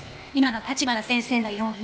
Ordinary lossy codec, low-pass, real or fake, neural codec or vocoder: none; none; fake; codec, 16 kHz, 0.8 kbps, ZipCodec